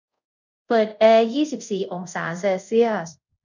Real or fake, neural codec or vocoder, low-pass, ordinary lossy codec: fake; codec, 24 kHz, 0.5 kbps, DualCodec; 7.2 kHz; none